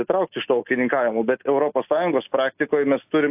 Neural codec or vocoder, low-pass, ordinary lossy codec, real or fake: none; 3.6 kHz; AAC, 32 kbps; real